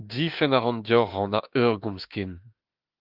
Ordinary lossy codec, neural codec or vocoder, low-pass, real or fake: Opus, 32 kbps; autoencoder, 48 kHz, 32 numbers a frame, DAC-VAE, trained on Japanese speech; 5.4 kHz; fake